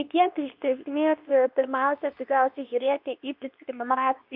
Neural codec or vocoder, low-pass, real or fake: codec, 24 kHz, 0.9 kbps, WavTokenizer, medium speech release version 2; 5.4 kHz; fake